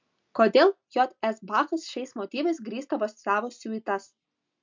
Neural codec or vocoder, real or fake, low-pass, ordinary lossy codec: none; real; 7.2 kHz; MP3, 64 kbps